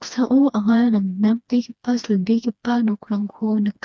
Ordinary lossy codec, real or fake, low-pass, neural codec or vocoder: none; fake; none; codec, 16 kHz, 2 kbps, FreqCodec, smaller model